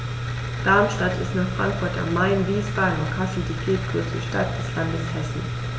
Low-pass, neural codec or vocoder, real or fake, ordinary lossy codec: none; none; real; none